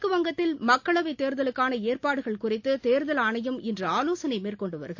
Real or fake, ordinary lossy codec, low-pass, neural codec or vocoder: real; AAC, 48 kbps; 7.2 kHz; none